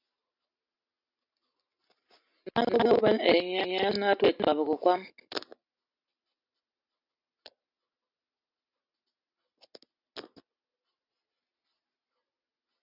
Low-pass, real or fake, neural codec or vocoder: 5.4 kHz; real; none